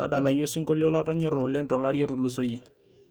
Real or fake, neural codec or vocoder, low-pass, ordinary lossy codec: fake; codec, 44.1 kHz, 2.6 kbps, DAC; none; none